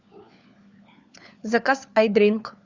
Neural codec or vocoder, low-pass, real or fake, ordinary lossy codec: codec, 16 kHz, 4 kbps, FunCodec, trained on LibriTTS, 50 frames a second; 7.2 kHz; fake; Opus, 64 kbps